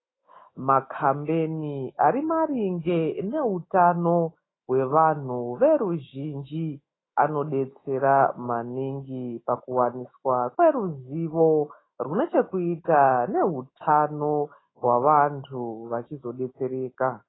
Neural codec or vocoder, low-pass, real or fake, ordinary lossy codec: autoencoder, 48 kHz, 128 numbers a frame, DAC-VAE, trained on Japanese speech; 7.2 kHz; fake; AAC, 16 kbps